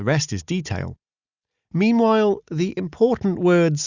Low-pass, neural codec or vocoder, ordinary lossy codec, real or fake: 7.2 kHz; none; Opus, 64 kbps; real